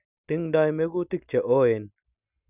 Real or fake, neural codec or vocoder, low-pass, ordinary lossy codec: real; none; 3.6 kHz; none